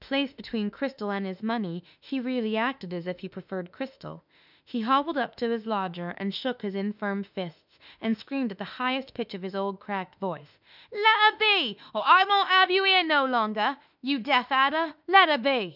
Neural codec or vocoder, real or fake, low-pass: autoencoder, 48 kHz, 32 numbers a frame, DAC-VAE, trained on Japanese speech; fake; 5.4 kHz